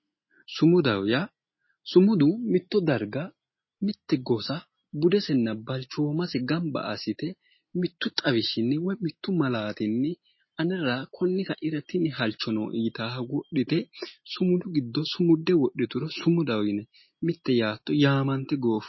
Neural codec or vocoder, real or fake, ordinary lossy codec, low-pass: none; real; MP3, 24 kbps; 7.2 kHz